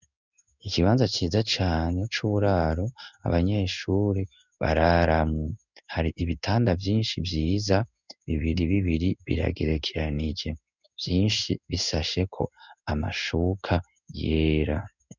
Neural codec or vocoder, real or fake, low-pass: codec, 16 kHz in and 24 kHz out, 1 kbps, XY-Tokenizer; fake; 7.2 kHz